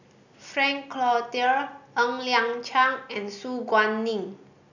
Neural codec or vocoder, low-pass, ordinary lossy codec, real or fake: none; 7.2 kHz; none; real